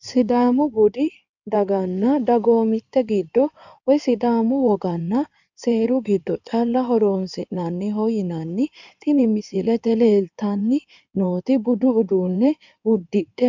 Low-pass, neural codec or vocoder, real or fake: 7.2 kHz; codec, 16 kHz in and 24 kHz out, 2.2 kbps, FireRedTTS-2 codec; fake